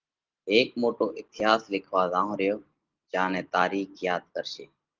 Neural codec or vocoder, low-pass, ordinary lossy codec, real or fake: none; 7.2 kHz; Opus, 16 kbps; real